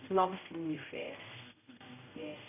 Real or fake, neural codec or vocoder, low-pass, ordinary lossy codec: fake; codec, 16 kHz, 0.5 kbps, X-Codec, HuBERT features, trained on general audio; 3.6 kHz; none